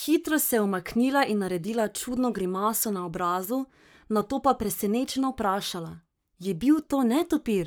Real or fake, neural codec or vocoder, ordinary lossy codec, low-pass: fake; codec, 44.1 kHz, 7.8 kbps, Pupu-Codec; none; none